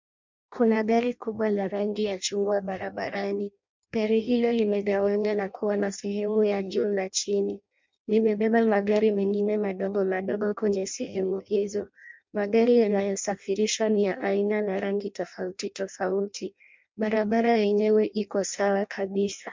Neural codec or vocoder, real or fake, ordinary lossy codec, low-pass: codec, 16 kHz in and 24 kHz out, 0.6 kbps, FireRedTTS-2 codec; fake; MP3, 64 kbps; 7.2 kHz